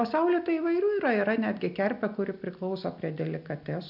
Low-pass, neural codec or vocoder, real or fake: 5.4 kHz; none; real